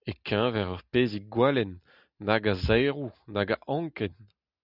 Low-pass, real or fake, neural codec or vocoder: 5.4 kHz; real; none